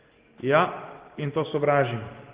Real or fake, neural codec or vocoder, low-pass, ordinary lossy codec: real; none; 3.6 kHz; Opus, 16 kbps